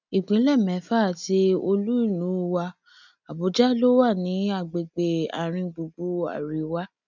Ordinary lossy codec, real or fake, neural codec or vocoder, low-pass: none; real; none; 7.2 kHz